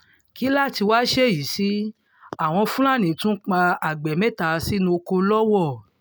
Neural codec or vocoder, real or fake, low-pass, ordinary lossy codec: none; real; none; none